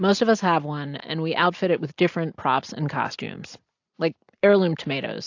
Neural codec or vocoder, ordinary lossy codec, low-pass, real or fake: none; AAC, 48 kbps; 7.2 kHz; real